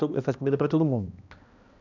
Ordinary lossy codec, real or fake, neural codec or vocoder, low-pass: MP3, 64 kbps; fake; codec, 16 kHz, 1 kbps, X-Codec, HuBERT features, trained on balanced general audio; 7.2 kHz